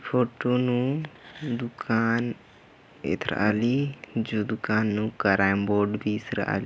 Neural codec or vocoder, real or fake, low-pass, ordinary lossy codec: none; real; none; none